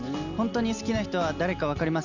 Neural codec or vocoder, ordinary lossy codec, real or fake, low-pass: none; none; real; 7.2 kHz